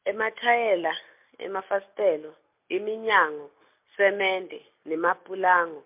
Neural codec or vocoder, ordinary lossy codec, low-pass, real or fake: none; MP3, 32 kbps; 3.6 kHz; real